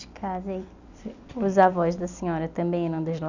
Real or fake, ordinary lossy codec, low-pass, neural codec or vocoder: real; none; 7.2 kHz; none